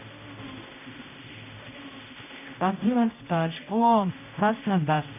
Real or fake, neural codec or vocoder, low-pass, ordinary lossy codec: fake; codec, 16 kHz, 0.5 kbps, X-Codec, HuBERT features, trained on general audio; 3.6 kHz; none